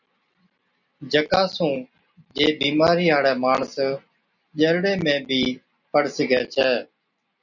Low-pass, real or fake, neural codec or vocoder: 7.2 kHz; real; none